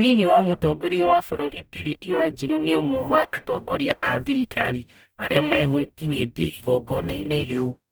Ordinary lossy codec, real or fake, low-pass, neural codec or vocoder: none; fake; none; codec, 44.1 kHz, 0.9 kbps, DAC